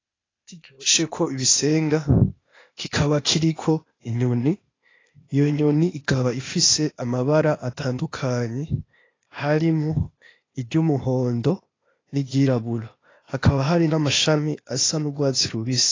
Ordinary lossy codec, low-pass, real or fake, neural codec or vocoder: AAC, 32 kbps; 7.2 kHz; fake; codec, 16 kHz, 0.8 kbps, ZipCodec